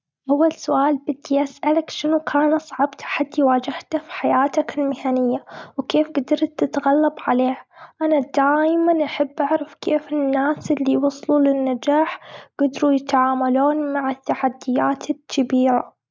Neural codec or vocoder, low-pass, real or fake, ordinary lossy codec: none; none; real; none